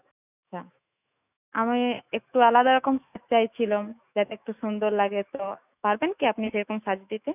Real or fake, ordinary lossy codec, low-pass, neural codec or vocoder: fake; none; 3.6 kHz; autoencoder, 48 kHz, 128 numbers a frame, DAC-VAE, trained on Japanese speech